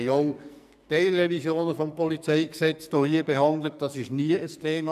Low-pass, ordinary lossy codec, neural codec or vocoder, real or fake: 14.4 kHz; none; codec, 44.1 kHz, 2.6 kbps, SNAC; fake